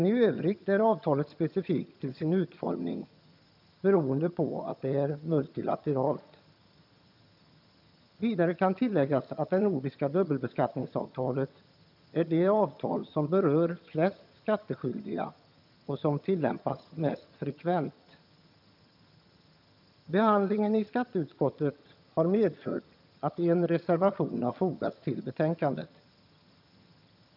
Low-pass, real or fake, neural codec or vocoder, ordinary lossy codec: 5.4 kHz; fake; vocoder, 22.05 kHz, 80 mel bands, HiFi-GAN; none